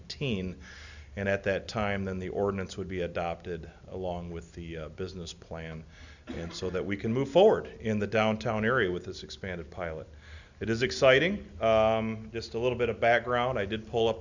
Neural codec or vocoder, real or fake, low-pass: none; real; 7.2 kHz